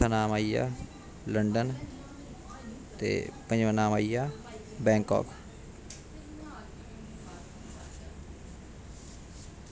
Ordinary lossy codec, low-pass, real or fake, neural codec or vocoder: none; none; real; none